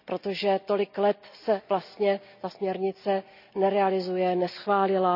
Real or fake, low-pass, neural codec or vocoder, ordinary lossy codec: real; 5.4 kHz; none; none